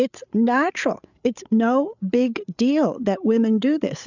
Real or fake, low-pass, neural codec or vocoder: fake; 7.2 kHz; codec, 16 kHz, 8 kbps, FreqCodec, larger model